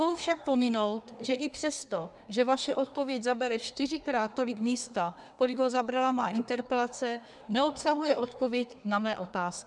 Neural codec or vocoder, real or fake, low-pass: codec, 24 kHz, 1 kbps, SNAC; fake; 10.8 kHz